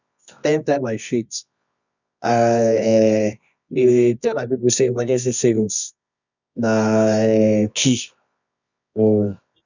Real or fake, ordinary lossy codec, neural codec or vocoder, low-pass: fake; none; codec, 24 kHz, 0.9 kbps, WavTokenizer, medium music audio release; 7.2 kHz